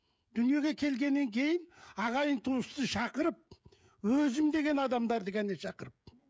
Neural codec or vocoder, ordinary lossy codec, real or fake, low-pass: codec, 16 kHz, 16 kbps, FreqCodec, smaller model; none; fake; none